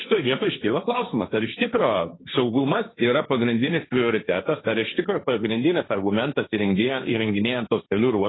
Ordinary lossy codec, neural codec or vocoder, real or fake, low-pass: AAC, 16 kbps; codec, 16 kHz, 1.1 kbps, Voila-Tokenizer; fake; 7.2 kHz